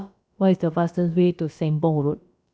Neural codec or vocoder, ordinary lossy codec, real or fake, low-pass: codec, 16 kHz, about 1 kbps, DyCAST, with the encoder's durations; none; fake; none